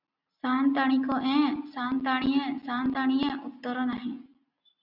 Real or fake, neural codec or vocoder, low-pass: real; none; 5.4 kHz